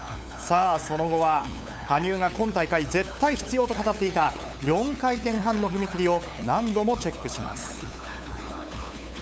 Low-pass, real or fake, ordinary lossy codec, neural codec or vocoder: none; fake; none; codec, 16 kHz, 8 kbps, FunCodec, trained on LibriTTS, 25 frames a second